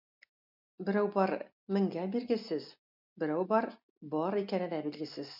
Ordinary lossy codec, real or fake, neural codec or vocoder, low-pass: MP3, 48 kbps; real; none; 5.4 kHz